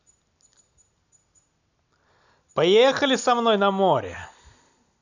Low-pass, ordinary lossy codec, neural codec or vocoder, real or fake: 7.2 kHz; none; none; real